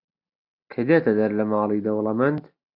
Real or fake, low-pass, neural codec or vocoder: real; 5.4 kHz; none